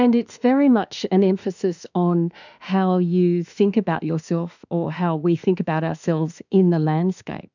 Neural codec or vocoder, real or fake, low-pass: autoencoder, 48 kHz, 32 numbers a frame, DAC-VAE, trained on Japanese speech; fake; 7.2 kHz